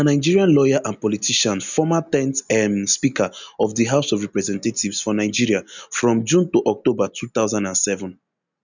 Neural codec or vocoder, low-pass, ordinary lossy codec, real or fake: none; 7.2 kHz; none; real